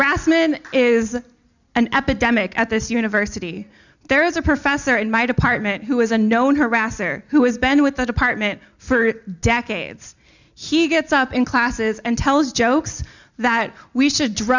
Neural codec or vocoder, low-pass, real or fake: none; 7.2 kHz; real